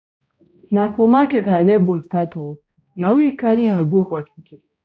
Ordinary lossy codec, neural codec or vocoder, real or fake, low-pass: none; codec, 16 kHz, 0.5 kbps, X-Codec, HuBERT features, trained on balanced general audio; fake; none